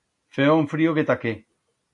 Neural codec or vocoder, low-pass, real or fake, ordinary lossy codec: none; 10.8 kHz; real; AAC, 64 kbps